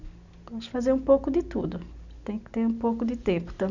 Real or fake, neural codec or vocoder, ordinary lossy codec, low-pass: real; none; none; 7.2 kHz